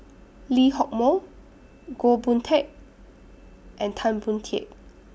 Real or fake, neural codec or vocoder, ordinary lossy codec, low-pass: real; none; none; none